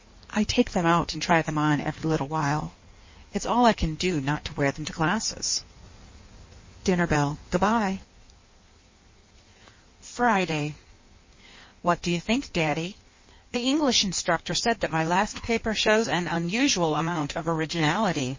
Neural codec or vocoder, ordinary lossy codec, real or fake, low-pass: codec, 16 kHz in and 24 kHz out, 1.1 kbps, FireRedTTS-2 codec; MP3, 32 kbps; fake; 7.2 kHz